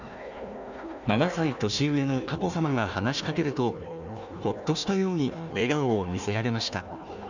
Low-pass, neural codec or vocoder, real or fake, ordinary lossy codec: 7.2 kHz; codec, 16 kHz, 1 kbps, FunCodec, trained on Chinese and English, 50 frames a second; fake; none